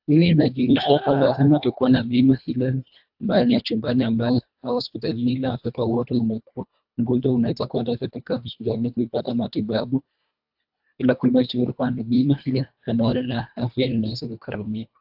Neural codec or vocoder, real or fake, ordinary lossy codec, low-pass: codec, 24 kHz, 1.5 kbps, HILCodec; fake; AAC, 48 kbps; 5.4 kHz